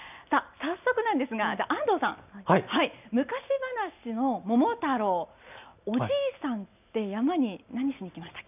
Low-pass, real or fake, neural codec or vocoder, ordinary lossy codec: 3.6 kHz; real; none; none